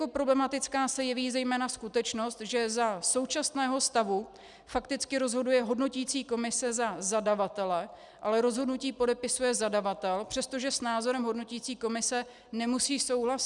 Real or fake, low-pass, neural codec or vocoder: real; 10.8 kHz; none